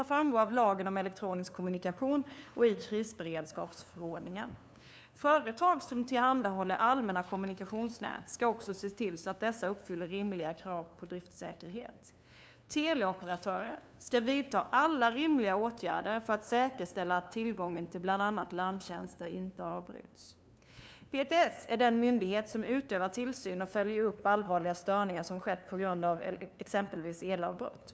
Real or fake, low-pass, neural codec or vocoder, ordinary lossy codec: fake; none; codec, 16 kHz, 2 kbps, FunCodec, trained on LibriTTS, 25 frames a second; none